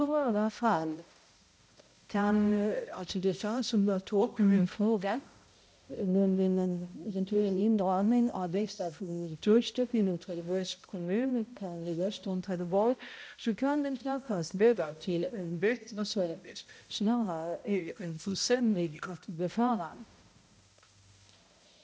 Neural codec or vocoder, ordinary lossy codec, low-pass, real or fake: codec, 16 kHz, 0.5 kbps, X-Codec, HuBERT features, trained on balanced general audio; none; none; fake